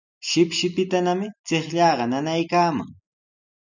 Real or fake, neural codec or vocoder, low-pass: real; none; 7.2 kHz